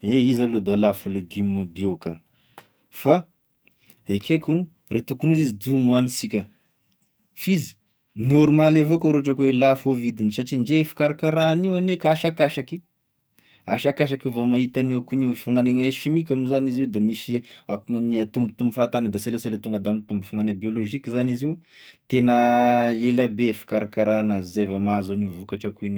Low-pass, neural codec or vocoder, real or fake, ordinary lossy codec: none; codec, 44.1 kHz, 2.6 kbps, SNAC; fake; none